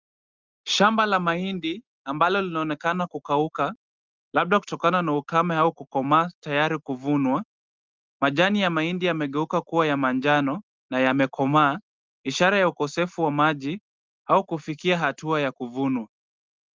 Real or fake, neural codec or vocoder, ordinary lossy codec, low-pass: real; none; Opus, 32 kbps; 7.2 kHz